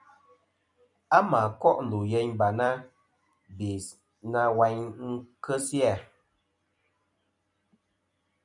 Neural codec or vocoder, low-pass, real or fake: vocoder, 44.1 kHz, 128 mel bands every 256 samples, BigVGAN v2; 10.8 kHz; fake